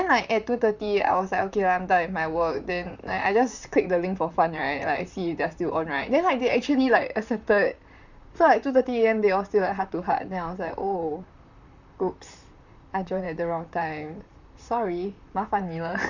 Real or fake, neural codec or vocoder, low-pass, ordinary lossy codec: fake; vocoder, 44.1 kHz, 128 mel bands, Pupu-Vocoder; 7.2 kHz; none